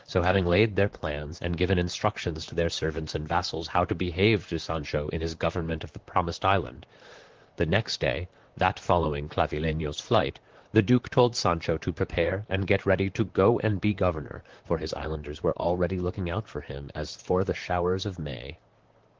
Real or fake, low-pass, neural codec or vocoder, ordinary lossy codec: fake; 7.2 kHz; vocoder, 44.1 kHz, 128 mel bands, Pupu-Vocoder; Opus, 16 kbps